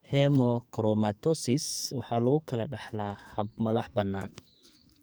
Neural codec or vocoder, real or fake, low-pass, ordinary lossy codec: codec, 44.1 kHz, 2.6 kbps, SNAC; fake; none; none